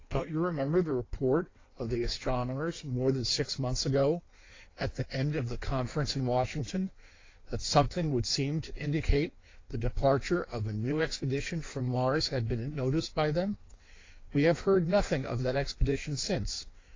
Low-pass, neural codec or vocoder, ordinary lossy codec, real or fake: 7.2 kHz; codec, 16 kHz in and 24 kHz out, 1.1 kbps, FireRedTTS-2 codec; AAC, 32 kbps; fake